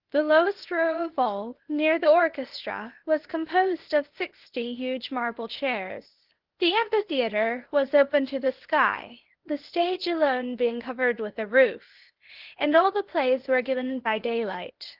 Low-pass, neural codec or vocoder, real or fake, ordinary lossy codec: 5.4 kHz; codec, 16 kHz, 0.8 kbps, ZipCodec; fake; Opus, 16 kbps